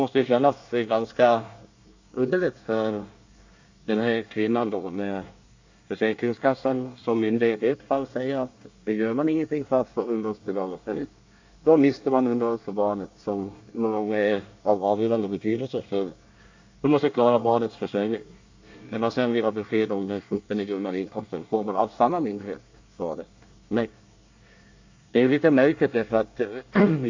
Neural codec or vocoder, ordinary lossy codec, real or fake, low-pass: codec, 24 kHz, 1 kbps, SNAC; AAC, 48 kbps; fake; 7.2 kHz